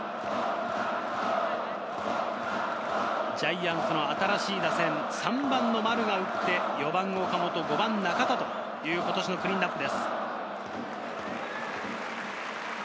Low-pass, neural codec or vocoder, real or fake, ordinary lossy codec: none; none; real; none